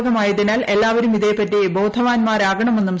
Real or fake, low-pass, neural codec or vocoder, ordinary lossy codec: real; none; none; none